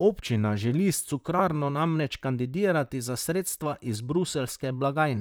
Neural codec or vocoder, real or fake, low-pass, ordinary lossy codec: vocoder, 44.1 kHz, 128 mel bands, Pupu-Vocoder; fake; none; none